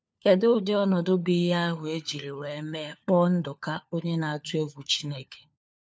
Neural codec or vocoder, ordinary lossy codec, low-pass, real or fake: codec, 16 kHz, 4 kbps, FunCodec, trained on LibriTTS, 50 frames a second; none; none; fake